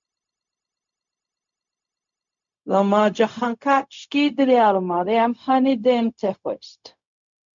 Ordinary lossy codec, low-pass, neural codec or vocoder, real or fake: MP3, 64 kbps; 7.2 kHz; codec, 16 kHz, 0.4 kbps, LongCat-Audio-Codec; fake